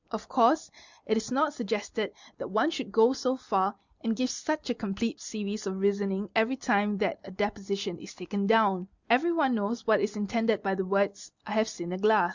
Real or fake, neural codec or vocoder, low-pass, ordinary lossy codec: real; none; 7.2 kHz; Opus, 64 kbps